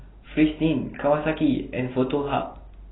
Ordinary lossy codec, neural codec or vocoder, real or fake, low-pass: AAC, 16 kbps; none; real; 7.2 kHz